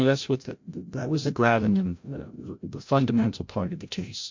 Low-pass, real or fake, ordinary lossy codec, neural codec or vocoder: 7.2 kHz; fake; MP3, 48 kbps; codec, 16 kHz, 0.5 kbps, FreqCodec, larger model